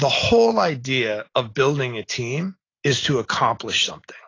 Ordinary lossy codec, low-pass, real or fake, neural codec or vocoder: AAC, 32 kbps; 7.2 kHz; real; none